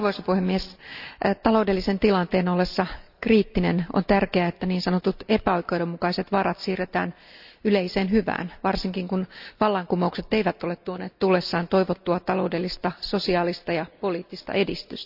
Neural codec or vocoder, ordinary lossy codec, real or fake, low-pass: none; none; real; 5.4 kHz